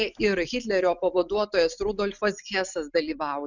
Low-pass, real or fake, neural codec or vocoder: 7.2 kHz; real; none